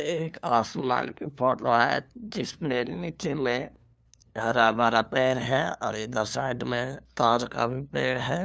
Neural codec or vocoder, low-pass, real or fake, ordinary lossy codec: codec, 16 kHz, 2 kbps, FunCodec, trained on LibriTTS, 25 frames a second; none; fake; none